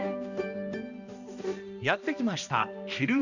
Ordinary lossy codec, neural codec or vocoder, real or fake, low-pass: none; codec, 16 kHz, 1 kbps, X-Codec, HuBERT features, trained on balanced general audio; fake; 7.2 kHz